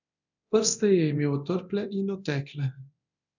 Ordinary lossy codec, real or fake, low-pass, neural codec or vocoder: none; fake; 7.2 kHz; codec, 24 kHz, 0.9 kbps, DualCodec